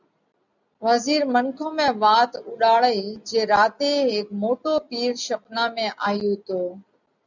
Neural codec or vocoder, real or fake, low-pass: none; real; 7.2 kHz